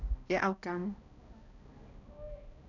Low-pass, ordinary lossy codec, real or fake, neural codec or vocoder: 7.2 kHz; none; fake; codec, 16 kHz, 1 kbps, X-Codec, HuBERT features, trained on balanced general audio